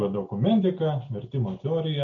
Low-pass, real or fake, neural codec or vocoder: 7.2 kHz; real; none